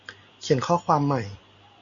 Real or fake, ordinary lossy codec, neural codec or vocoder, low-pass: real; MP3, 48 kbps; none; 7.2 kHz